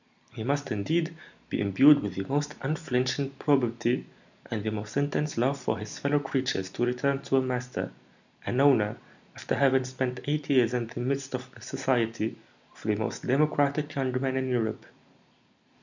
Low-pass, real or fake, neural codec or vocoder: 7.2 kHz; real; none